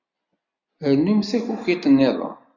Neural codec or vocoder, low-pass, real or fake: none; 7.2 kHz; real